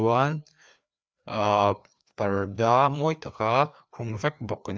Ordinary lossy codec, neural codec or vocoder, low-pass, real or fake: none; codec, 16 kHz, 2 kbps, FreqCodec, larger model; none; fake